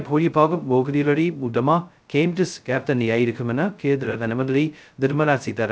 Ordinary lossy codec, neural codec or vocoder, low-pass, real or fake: none; codec, 16 kHz, 0.2 kbps, FocalCodec; none; fake